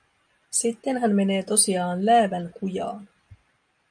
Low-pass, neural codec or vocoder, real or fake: 9.9 kHz; none; real